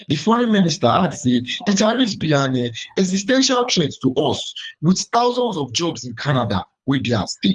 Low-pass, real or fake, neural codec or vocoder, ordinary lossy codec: 10.8 kHz; fake; codec, 24 kHz, 3 kbps, HILCodec; none